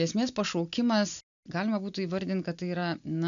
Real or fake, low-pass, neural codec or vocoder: real; 7.2 kHz; none